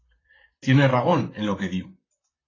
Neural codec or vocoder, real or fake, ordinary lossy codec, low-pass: vocoder, 44.1 kHz, 128 mel bands, Pupu-Vocoder; fake; AAC, 32 kbps; 7.2 kHz